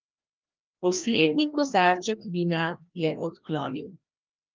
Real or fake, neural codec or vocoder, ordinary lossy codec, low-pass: fake; codec, 16 kHz, 1 kbps, FreqCodec, larger model; Opus, 24 kbps; 7.2 kHz